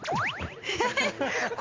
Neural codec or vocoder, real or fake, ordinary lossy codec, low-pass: none; real; Opus, 24 kbps; 7.2 kHz